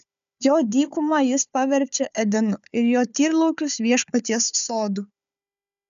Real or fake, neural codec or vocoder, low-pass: fake; codec, 16 kHz, 4 kbps, FunCodec, trained on Chinese and English, 50 frames a second; 7.2 kHz